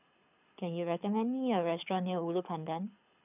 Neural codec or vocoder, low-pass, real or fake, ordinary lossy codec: codec, 24 kHz, 6 kbps, HILCodec; 3.6 kHz; fake; none